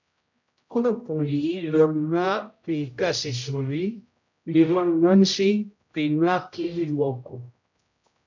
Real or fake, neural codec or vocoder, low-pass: fake; codec, 16 kHz, 0.5 kbps, X-Codec, HuBERT features, trained on general audio; 7.2 kHz